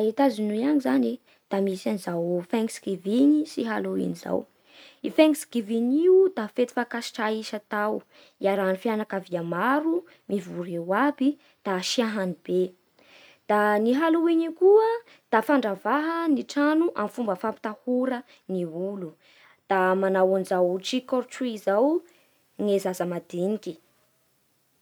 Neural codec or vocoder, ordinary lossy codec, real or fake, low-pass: none; none; real; none